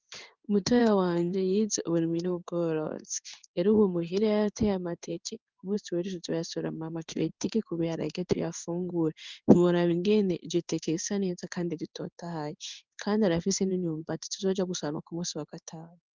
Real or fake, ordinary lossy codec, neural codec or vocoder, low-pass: fake; Opus, 24 kbps; codec, 16 kHz in and 24 kHz out, 1 kbps, XY-Tokenizer; 7.2 kHz